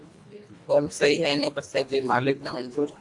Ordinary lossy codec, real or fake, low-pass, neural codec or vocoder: AAC, 64 kbps; fake; 10.8 kHz; codec, 24 kHz, 1.5 kbps, HILCodec